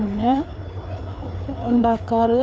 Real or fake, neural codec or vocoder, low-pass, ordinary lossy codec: fake; codec, 16 kHz, 4 kbps, FreqCodec, larger model; none; none